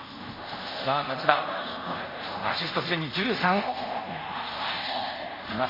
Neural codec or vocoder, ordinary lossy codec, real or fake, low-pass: codec, 24 kHz, 0.5 kbps, DualCodec; MP3, 24 kbps; fake; 5.4 kHz